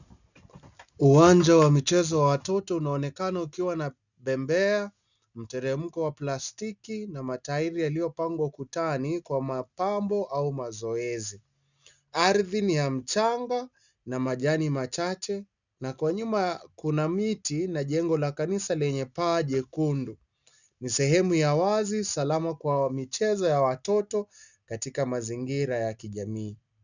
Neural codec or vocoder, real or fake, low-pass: none; real; 7.2 kHz